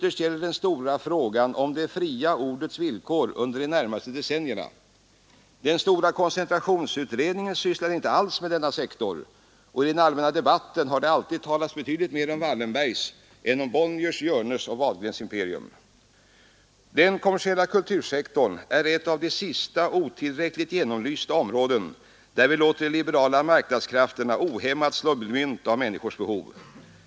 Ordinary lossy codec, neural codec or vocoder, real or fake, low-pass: none; none; real; none